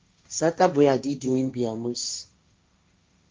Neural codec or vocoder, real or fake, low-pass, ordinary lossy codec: codec, 16 kHz, 1.1 kbps, Voila-Tokenizer; fake; 7.2 kHz; Opus, 24 kbps